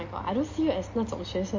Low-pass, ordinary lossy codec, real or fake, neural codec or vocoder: 7.2 kHz; MP3, 32 kbps; real; none